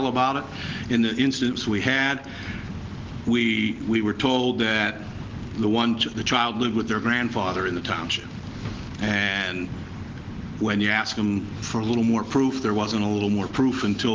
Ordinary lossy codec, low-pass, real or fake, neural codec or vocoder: Opus, 16 kbps; 7.2 kHz; real; none